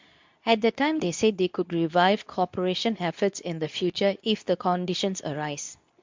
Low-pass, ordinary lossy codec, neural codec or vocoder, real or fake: 7.2 kHz; MP3, 64 kbps; codec, 24 kHz, 0.9 kbps, WavTokenizer, medium speech release version 2; fake